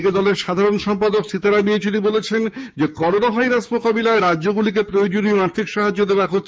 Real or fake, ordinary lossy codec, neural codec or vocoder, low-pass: fake; none; codec, 16 kHz, 6 kbps, DAC; none